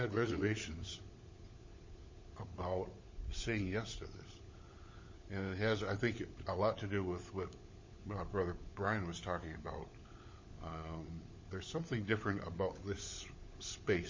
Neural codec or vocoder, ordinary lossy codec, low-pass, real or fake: codec, 16 kHz, 16 kbps, FunCodec, trained on LibriTTS, 50 frames a second; MP3, 32 kbps; 7.2 kHz; fake